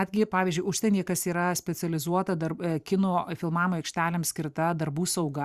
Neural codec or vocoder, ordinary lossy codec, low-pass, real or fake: vocoder, 44.1 kHz, 128 mel bands every 512 samples, BigVGAN v2; Opus, 64 kbps; 14.4 kHz; fake